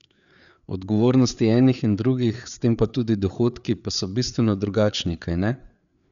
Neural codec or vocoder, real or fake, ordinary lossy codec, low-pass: codec, 16 kHz, 4 kbps, FreqCodec, larger model; fake; none; 7.2 kHz